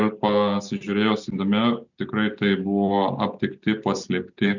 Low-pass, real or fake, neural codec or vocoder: 7.2 kHz; real; none